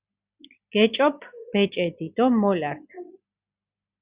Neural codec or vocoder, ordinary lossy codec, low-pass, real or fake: none; Opus, 64 kbps; 3.6 kHz; real